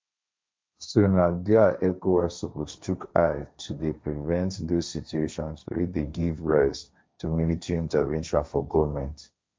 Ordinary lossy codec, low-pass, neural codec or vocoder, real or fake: none; none; codec, 16 kHz, 1.1 kbps, Voila-Tokenizer; fake